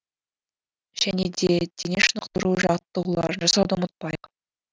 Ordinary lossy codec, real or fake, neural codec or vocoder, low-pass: none; real; none; none